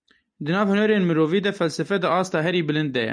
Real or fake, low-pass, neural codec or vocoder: real; 9.9 kHz; none